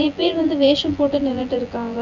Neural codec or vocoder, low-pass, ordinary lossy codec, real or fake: vocoder, 24 kHz, 100 mel bands, Vocos; 7.2 kHz; none; fake